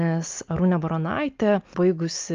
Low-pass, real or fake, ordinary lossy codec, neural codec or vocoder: 7.2 kHz; real; Opus, 32 kbps; none